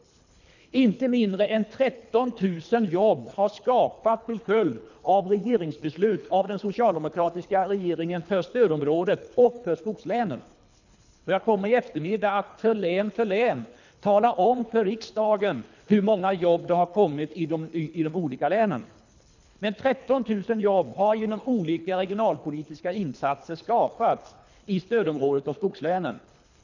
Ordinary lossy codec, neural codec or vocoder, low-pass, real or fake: none; codec, 24 kHz, 3 kbps, HILCodec; 7.2 kHz; fake